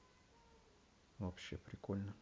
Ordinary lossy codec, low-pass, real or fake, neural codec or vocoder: none; none; real; none